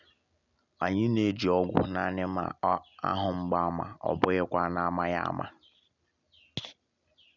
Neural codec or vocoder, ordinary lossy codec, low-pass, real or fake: none; none; 7.2 kHz; real